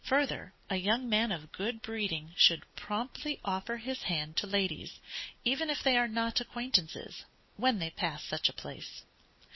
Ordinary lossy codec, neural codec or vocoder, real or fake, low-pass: MP3, 24 kbps; none; real; 7.2 kHz